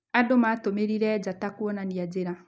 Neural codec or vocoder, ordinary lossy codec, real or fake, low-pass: none; none; real; none